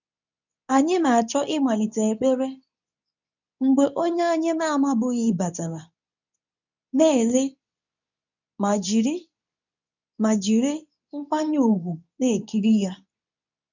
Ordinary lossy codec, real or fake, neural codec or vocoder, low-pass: none; fake; codec, 24 kHz, 0.9 kbps, WavTokenizer, medium speech release version 2; 7.2 kHz